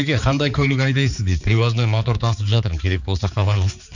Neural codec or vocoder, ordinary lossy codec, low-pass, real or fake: codec, 16 kHz, 4 kbps, X-Codec, HuBERT features, trained on balanced general audio; none; 7.2 kHz; fake